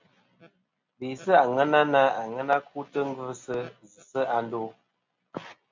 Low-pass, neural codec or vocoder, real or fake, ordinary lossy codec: 7.2 kHz; none; real; MP3, 64 kbps